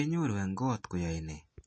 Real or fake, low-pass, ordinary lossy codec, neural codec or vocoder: real; 9.9 kHz; MP3, 32 kbps; none